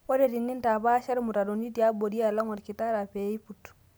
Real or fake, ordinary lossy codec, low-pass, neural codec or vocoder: real; none; none; none